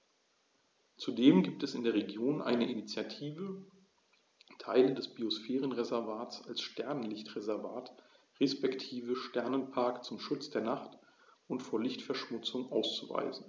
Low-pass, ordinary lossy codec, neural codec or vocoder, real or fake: none; none; none; real